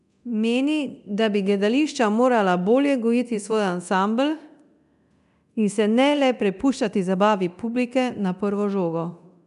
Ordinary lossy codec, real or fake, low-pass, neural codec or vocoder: none; fake; 10.8 kHz; codec, 24 kHz, 0.9 kbps, DualCodec